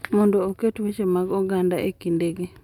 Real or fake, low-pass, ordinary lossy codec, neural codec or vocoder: real; 19.8 kHz; none; none